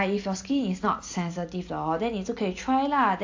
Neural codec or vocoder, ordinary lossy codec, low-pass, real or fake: none; AAC, 48 kbps; 7.2 kHz; real